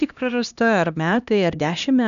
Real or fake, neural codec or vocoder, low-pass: fake; codec, 16 kHz, 1 kbps, X-Codec, HuBERT features, trained on LibriSpeech; 7.2 kHz